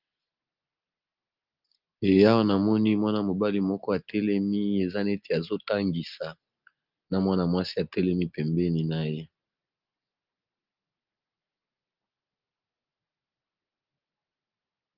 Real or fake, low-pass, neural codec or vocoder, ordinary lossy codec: real; 5.4 kHz; none; Opus, 24 kbps